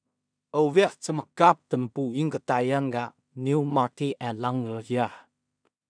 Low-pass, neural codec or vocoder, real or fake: 9.9 kHz; codec, 16 kHz in and 24 kHz out, 0.4 kbps, LongCat-Audio-Codec, two codebook decoder; fake